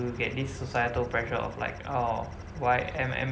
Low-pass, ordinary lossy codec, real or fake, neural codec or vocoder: none; none; real; none